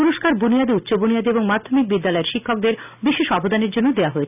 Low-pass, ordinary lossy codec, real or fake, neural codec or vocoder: 3.6 kHz; none; real; none